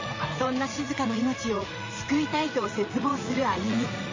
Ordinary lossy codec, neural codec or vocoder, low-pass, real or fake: MP3, 32 kbps; vocoder, 44.1 kHz, 80 mel bands, Vocos; 7.2 kHz; fake